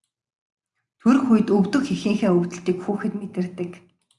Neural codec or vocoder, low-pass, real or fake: none; 10.8 kHz; real